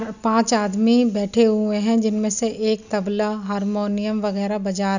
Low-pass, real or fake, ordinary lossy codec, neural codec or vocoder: 7.2 kHz; real; none; none